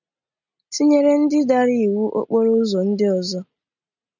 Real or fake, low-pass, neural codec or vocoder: real; 7.2 kHz; none